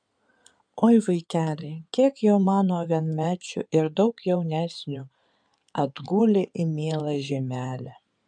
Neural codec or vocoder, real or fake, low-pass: codec, 16 kHz in and 24 kHz out, 2.2 kbps, FireRedTTS-2 codec; fake; 9.9 kHz